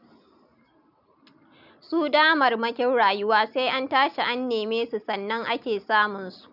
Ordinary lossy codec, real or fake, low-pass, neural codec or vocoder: none; real; 5.4 kHz; none